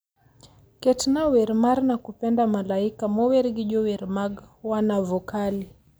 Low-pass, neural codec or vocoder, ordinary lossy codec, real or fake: none; none; none; real